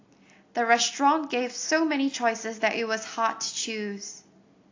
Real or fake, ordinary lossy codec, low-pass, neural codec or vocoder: real; AAC, 48 kbps; 7.2 kHz; none